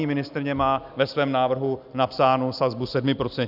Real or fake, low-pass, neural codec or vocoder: real; 5.4 kHz; none